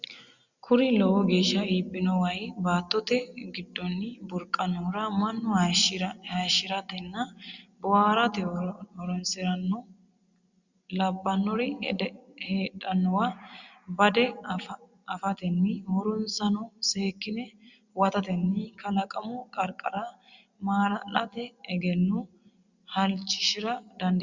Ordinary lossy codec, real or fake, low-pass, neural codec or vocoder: Opus, 64 kbps; real; 7.2 kHz; none